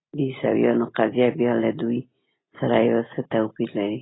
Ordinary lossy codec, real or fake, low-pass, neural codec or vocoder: AAC, 16 kbps; real; 7.2 kHz; none